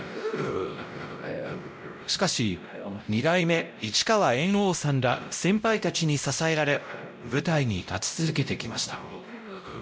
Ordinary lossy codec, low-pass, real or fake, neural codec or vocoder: none; none; fake; codec, 16 kHz, 0.5 kbps, X-Codec, WavLM features, trained on Multilingual LibriSpeech